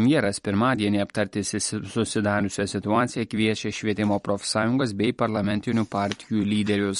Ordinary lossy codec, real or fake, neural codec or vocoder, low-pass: MP3, 48 kbps; fake; vocoder, 44.1 kHz, 128 mel bands every 256 samples, BigVGAN v2; 19.8 kHz